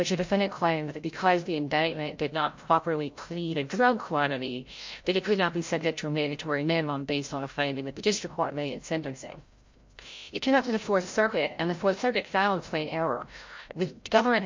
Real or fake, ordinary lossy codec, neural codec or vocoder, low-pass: fake; MP3, 48 kbps; codec, 16 kHz, 0.5 kbps, FreqCodec, larger model; 7.2 kHz